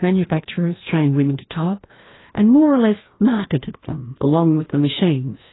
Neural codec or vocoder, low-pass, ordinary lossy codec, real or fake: codec, 16 kHz, 1 kbps, FreqCodec, larger model; 7.2 kHz; AAC, 16 kbps; fake